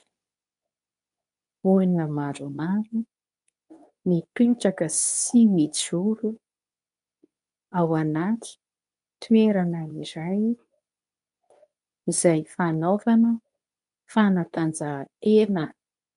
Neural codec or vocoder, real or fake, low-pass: codec, 24 kHz, 0.9 kbps, WavTokenizer, medium speech release version 2; fake; 10.8 kHz